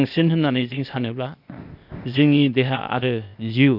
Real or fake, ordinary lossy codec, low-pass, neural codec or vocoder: fake; none; 5.4 kHz; codec, 16 kHz, 0.8 kbps, ZipCodec